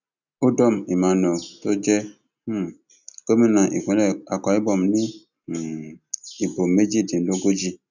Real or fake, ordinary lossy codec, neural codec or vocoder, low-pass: real; none; none; 7.2 kHz